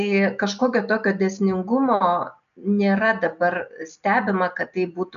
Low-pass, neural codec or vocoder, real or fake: 7.2 kHz; none; real